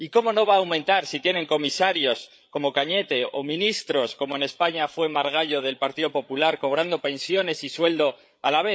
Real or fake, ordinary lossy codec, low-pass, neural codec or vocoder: fake; none; none; codec, 16 kHz, 8 kbps, FreqCodec, larger model